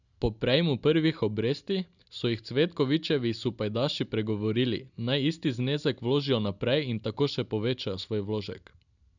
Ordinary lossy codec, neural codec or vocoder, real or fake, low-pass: none; none; real; 7.2 kHz